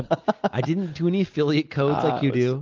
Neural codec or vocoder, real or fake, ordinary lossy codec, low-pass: none; real; Opus, 32 kbps; 7.2 kHz